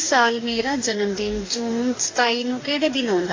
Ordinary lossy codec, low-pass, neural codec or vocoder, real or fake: AAC, 32 kbps; 7.2 kHz; codec, 44.1 kHz, 2.6 kbps, DAC; fake